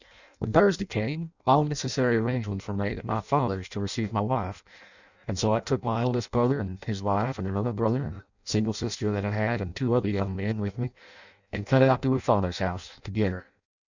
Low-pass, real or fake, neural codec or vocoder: 7.2 kHz; fake; codec, 16 kHz in and 24 kHz out, 0.6 kbps, FireRedTTS-2 codec